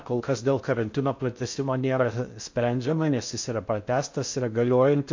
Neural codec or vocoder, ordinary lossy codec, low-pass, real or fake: codec, 16 kHz in and 24 kHz out, 0.6 kbps, FocalCodec, streaming, 4096 codes; MP3, 48 kbps; 7.2 kHz; fake